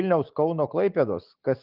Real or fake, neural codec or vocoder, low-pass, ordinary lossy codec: real; none; 5.4 kHz; Opus, 24 kbps